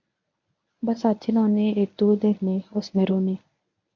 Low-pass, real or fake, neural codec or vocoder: 7.2 kHz; fake; codec, 24 kHz, 0.9 kbps, WavTokenizer, medium speech release version 1